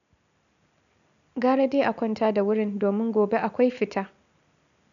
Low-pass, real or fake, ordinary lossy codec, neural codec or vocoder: 7.2 kHz; real; none; none